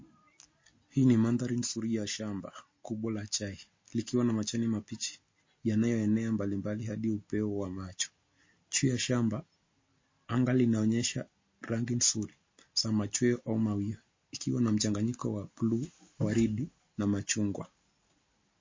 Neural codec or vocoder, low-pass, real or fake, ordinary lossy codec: none; 7.2 kHz; real; MP3, 32 kbps